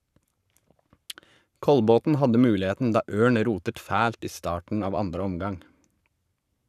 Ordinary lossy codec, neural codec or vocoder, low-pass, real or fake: none; codec, 44.1 kHz, 7.8 kbps, Pupu-Codec; 14.4 kHz; fake